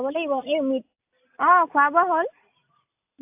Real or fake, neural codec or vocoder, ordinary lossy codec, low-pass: real; none; AAC, 32 kbps; 3.6 kHz